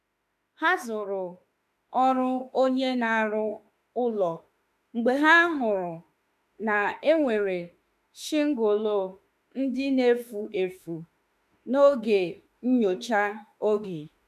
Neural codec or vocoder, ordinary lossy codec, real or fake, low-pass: autoencoder, 48 kHz, 32 numbers a frame, DAC-VAE, trained on Japanese speech; none; fake; 14.4 kHz